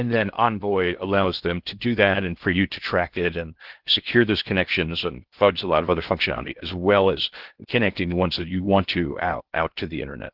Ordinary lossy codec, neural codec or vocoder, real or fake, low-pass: Opus, 16 kbps; codec, 16 kHz in and 24 kHz out, 0.6 kbps, FocalCodec, streaming, 2048 codes; fake; 5.4 kHz